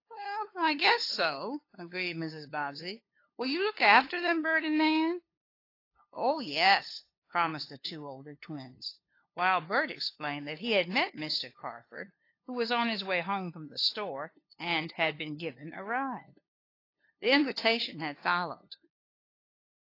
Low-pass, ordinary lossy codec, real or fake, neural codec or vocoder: 5.4 kHz; AAC, 32 kbps; fake; codec, 16 kHz, 2 kbps, FunCodec, trained on LibriTTS, 25 frames a second